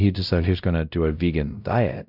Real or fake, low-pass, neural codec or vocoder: fake; 5.4 kHz; codec, 16 kHz, 0.5 kbps, X-Codec, WavLM features, trained on Multilingual LibriSpeech